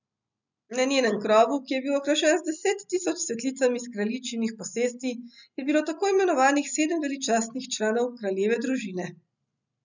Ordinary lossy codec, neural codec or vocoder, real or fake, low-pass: none; none; real; 7.2 kHz